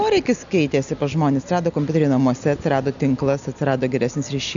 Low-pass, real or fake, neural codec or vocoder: 7.2 kHz; real; none